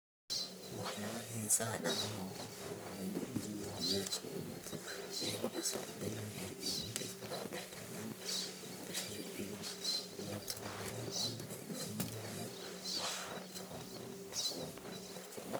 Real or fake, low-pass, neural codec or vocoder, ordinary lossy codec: fake; none; codec, 44.1 kHz, 1.7 kbps, Pupu-Codec; none